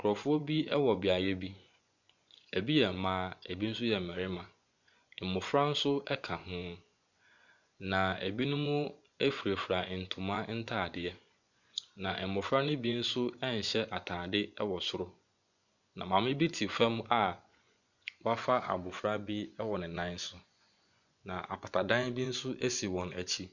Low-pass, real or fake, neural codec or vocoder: 7.2 kHz; fake; vocoder, 24 kHz, 100 mel bands, Vocos